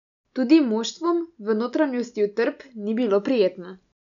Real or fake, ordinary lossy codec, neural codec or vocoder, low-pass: real; none; none; 7.2 kHz